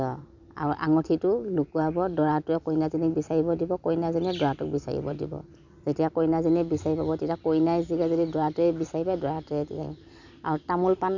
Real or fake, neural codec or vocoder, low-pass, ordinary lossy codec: fake; vocoder, 44.1 kHz, 128 mel bands every 256 samples, BigVGAN v2; 7.2 kHz; none